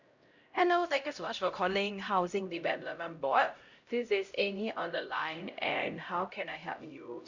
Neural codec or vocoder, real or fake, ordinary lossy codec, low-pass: codec, 16 kHz, 0.5 kbps, X-Codec, HuBERT features, trained on LibriSpeech; fake; Opus, 64 kbps; 7.2 kHz